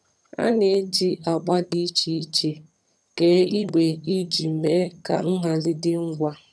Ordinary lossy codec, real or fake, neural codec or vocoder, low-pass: none; fake; vocoder, 22.05 kHz, 80 mel bands, HiFi-GAN; none